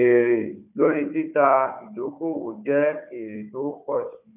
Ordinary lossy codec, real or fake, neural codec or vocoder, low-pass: none; fake; codec, 16 kHz, 4 kbps, FunCodec, trained on Chinese and English, 50 frames a second; 3.6 kHz